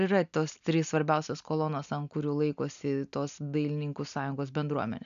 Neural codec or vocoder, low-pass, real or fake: none; 7.2 kHz; real